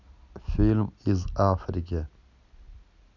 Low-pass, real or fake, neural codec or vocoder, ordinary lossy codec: 7.2 kHz; real; none; none